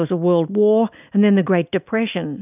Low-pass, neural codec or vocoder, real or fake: 3.6 kHz; none; real